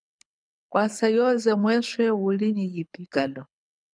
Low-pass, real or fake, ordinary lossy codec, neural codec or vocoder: 9.9 kHz; fake; MP3, 96 kbps; codec, 24 kHz, 6 kbps, HILCodec